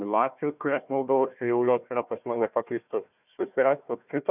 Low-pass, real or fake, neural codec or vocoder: 3.6 kHz; fake; codec, 16 kHz, 1 kbps, FunCodec, trained on Chinese and English, 50 frames a second